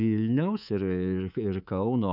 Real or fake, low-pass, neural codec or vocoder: fake; 5.4 kHz; codec, 24 kHz, 3.1 kbps, DualCodec